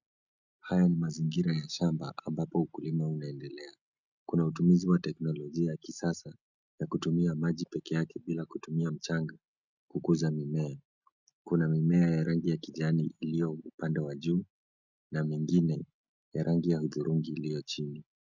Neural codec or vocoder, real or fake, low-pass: none; real; 7.2 kHz